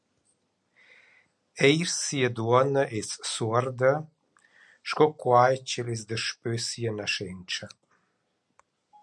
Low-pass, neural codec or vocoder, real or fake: 10.8 kHz; none; real